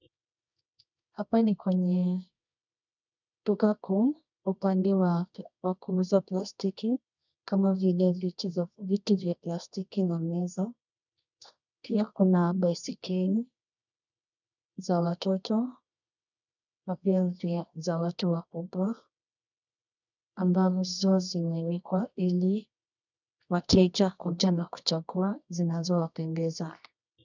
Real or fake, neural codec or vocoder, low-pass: fake; codec, 24 kHz, 0.9 kbps, WavTokenizer, medium music audio release; 7.2 kHz